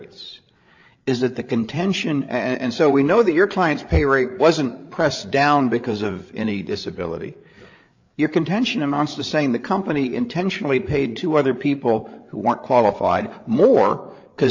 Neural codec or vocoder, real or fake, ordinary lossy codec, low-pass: codec, 16 kHz, 8 kbps, FreqCodec, larger model; fake; AAC, 48 kbps; 7.2 kHz